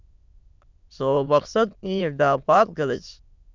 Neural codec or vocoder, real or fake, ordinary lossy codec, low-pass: autoencoder, 22.05 kHz, a latent of 192 numbers a frame, VITS, trained on many speakers; fake; Opus, 64 kbps; 7.2 kHz